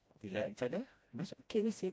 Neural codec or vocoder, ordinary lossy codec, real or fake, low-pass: codec, 16 kHz, 1 kbps, FreqCodec, smaller model; none; fake; none